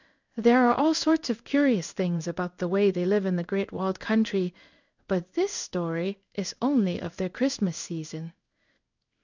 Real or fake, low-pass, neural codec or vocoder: fake; 7.2 kHz; codec, 16 kHz in and 24 kHz out, 1 kbps, XY-Tokenizer